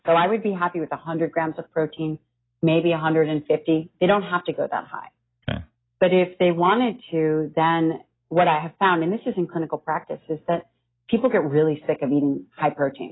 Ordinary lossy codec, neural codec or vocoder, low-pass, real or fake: AAC, 16 kbps; none; 7.2 kHz; real